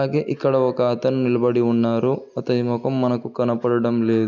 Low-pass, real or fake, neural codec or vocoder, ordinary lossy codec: 7.2 kHz; real; none; none